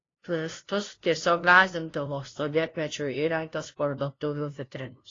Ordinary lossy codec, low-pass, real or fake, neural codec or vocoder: AAC, 32 kbps; 7.2 kHz; fake; codec, 16 kHz, 0.5 kbps, FunCodec, trained on LibriTTS, 25 frames a second